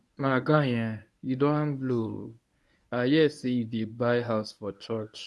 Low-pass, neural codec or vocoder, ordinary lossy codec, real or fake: none; codec, 24 kHz, 0.9 kbps, WavTokenizer, medium speech release version 1; none; fake